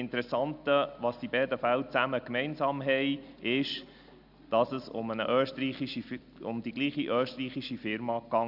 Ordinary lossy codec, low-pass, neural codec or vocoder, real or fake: none; 5.4 kHz; none; real